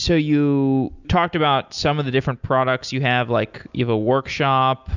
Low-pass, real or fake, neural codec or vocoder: 7.2 kHz; real; none